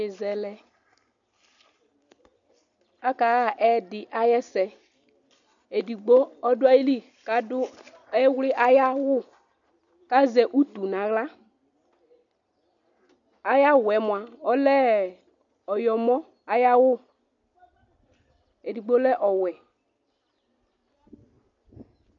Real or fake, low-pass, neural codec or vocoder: real; 7.2 kHz; none